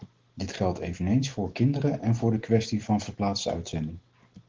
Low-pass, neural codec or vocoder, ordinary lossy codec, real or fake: 7.2 kHz; none; Opus, 16 kbps; real